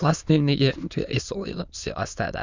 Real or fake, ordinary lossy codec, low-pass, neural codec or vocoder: fake; Opus, 64 kbps; 7.2 kHz; autoencoder, 22.05 kHz, a latent of 192 numbers a frame, VITS, trained on many speakers